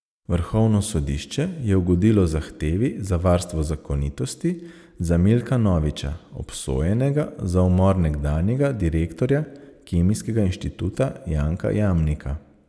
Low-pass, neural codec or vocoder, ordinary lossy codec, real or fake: none; none; none; real